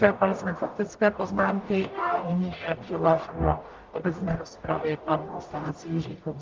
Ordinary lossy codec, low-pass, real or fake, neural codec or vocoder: Opus, 32 kbps; 7.2 kHz; fake; codec, 44.1 kHz, 0.9 kbps, DAC